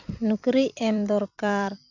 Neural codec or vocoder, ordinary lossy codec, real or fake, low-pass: vocoder, 44.1 kHz, 128 mel bands every 256 samples, BigVGAN v2; none; fake; 7.2 kHz